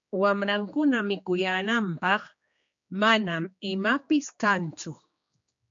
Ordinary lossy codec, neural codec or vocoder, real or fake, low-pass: MP3, 48 kbps; codec, 16 kHz, 2 kbps, X-Codec, HuBERT features, trained on general audio; fake; 7.2 kHz